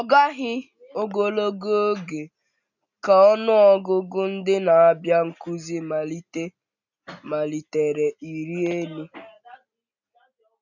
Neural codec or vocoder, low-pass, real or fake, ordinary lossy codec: none; 7.2 kHz; real; none